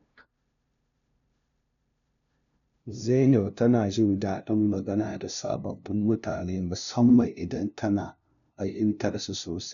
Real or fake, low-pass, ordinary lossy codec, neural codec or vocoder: fake; 7.2 kHz; none; codec, 16 kHz, 0.5 kbps, FunCodec, trained on LibriTTS, 25 frames a second